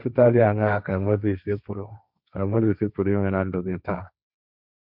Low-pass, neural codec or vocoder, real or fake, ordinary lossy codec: 5.4 kHz; codec, 16 kHz, 1.1 kbps, Voila-Tokenizer; fake; none